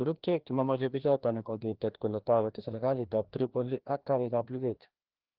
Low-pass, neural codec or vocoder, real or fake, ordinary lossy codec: 5.4 kHz; codec, 16 kHz, 1 kbps, FreqCodec, larger model; fake; Opus, 16 kbps